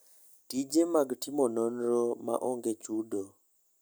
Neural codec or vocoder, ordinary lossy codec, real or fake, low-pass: none; none; real; none